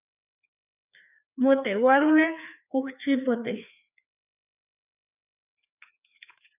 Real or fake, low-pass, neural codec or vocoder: fake; 3.6 kHz; codec, 16 kHz, 2 kbps, FreqCodec, larger model